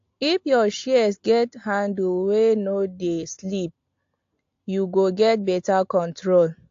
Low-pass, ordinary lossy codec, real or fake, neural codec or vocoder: 7.2 kHz; AAC, 48 kbps; real; none